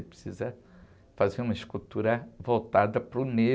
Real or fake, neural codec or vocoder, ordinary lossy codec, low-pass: real; none; none; none